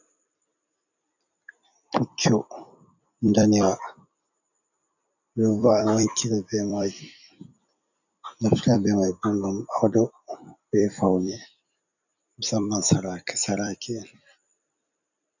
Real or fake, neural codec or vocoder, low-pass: fake; vocoder, 44.1 kHz, 128 mel bands every 256 samples, BigVGAN v2; 7.2 kHz